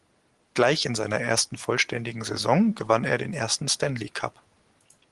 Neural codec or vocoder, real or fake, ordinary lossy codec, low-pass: none; real; Opus, 24 kbps; 10.8 kHz